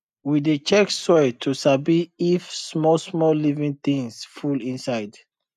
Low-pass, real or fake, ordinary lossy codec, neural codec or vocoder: 14.4 kHz; real; none; none